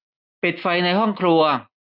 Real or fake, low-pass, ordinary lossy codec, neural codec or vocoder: real; 5.4 kHz; none; none